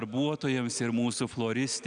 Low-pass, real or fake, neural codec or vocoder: 9.9 kHz; real; none